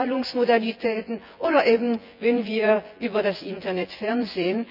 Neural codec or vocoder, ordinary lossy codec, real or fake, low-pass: vocoder, 24 kHz, 100 mel bands, Vocos; none; fake; 5.4 kHz